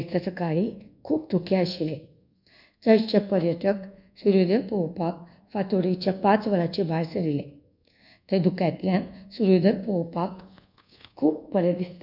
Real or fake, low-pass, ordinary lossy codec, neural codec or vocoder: fake; 5.4 kHz; none; codec, 24 kHz, 1.2 kbps, DualCodec